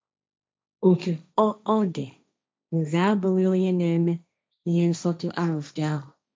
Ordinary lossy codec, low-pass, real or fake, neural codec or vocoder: none; none; fake; codec, 16 kHz, 1.1 kbps, Voila-Tokenizer